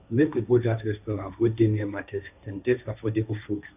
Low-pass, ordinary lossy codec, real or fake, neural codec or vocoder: 3.6 kHz; none; fake; codec, 16 kHz, 1.1 kbps, Voila-Tokenizer